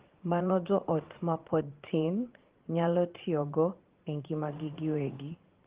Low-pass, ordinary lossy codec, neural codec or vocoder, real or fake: 3.6 kHz; Opus, 16 kbps; vocoder, 44.1 kHz, 80 mel bands, Vocos; fake